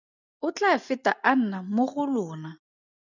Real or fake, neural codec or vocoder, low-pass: real; none; 7.2 kHz